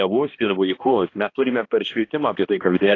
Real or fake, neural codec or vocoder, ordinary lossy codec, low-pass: fake; codec, 16 kHz, 1 kbps, X-Codec, HuBERT features, trained on balanced general audio; AAC, 32 kbps; 7.2 kHz